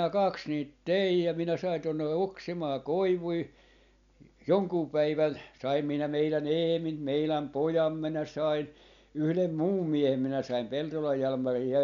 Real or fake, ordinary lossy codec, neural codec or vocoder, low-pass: real; none; none; 7.2 kHz